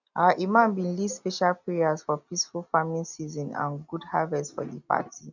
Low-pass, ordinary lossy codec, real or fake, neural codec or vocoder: 7.2 kHz; none; real; none